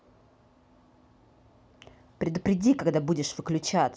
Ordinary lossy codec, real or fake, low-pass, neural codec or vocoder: none; real; none; none